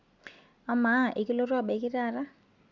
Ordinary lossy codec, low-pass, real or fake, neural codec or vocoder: Opus, 64 kbps; 7.2 kHz; real; none